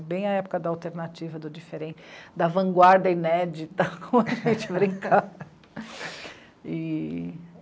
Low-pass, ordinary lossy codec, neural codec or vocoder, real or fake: none; none; none; real